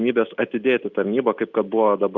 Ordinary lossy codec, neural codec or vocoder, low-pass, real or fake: Opus, 64 kbps; none; 7.2 kHz; real